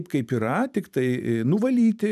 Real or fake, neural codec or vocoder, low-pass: fake; vocoder, 44.1 kHz, 128 mel bands every 512 samples, BigVGAN v2; 14.4 kHz